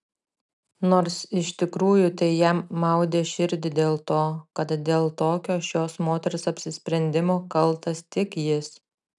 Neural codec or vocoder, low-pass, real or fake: none; 10.8 kHz; real